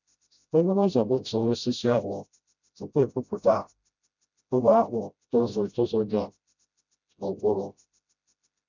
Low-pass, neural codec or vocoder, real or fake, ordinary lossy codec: 7.2 kHz; codec, 16 kHz, 0.5 kbps, FreqCodec, smaller model; fake; none